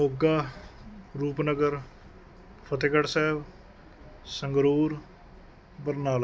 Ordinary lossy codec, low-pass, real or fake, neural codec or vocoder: none; none; real; none